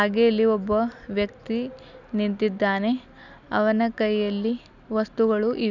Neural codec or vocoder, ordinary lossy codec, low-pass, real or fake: none; none; 7.2 kHz; real